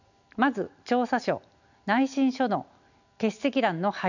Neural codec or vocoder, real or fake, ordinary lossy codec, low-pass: none; real; none; 7.2 kHz